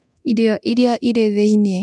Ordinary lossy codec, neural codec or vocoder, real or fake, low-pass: none; codec, 24 kHz, 0.9 kbps, DualCodec; fake; none